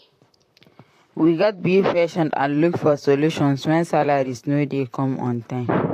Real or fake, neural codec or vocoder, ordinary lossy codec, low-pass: fake; vocoder, 44.1 kHz, 128 mel bands, Pupu-Vocoder; AAC, 64 kbps; 14.4 kHz